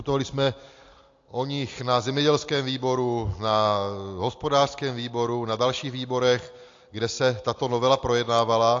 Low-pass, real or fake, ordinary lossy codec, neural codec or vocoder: 7.2 kHz; real; AAC, 48 kbps; none